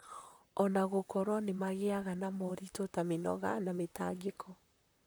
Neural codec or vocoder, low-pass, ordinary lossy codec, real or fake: vocoder, 44.1 kHz, 128 mel bands, Pupu-Vocoder; none; none; fake